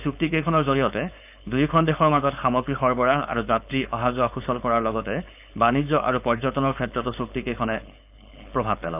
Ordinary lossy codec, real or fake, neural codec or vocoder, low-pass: none; fake; codec, 16 kHz, 4.8 kbps, FACodec; 3.6 kHz